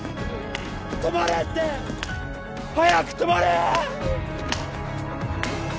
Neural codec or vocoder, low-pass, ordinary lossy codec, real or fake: none; none; none; real